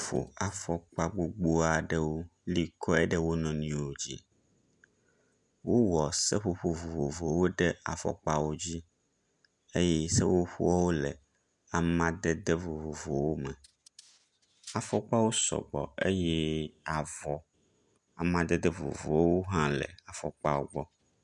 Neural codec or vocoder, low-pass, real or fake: none; 10.8 kHz; real